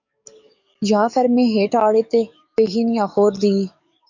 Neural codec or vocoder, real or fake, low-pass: codec, 44.1 kHz, 7.8 kbps, DAC; fake; 7.2 kHz